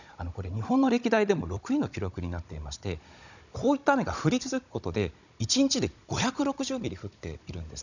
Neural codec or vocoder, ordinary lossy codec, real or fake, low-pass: codec, 16 kHz, 16 kbps, FunCodec, trained on Chinese and English, 50 frames a second; none; fake; 7.2 kHz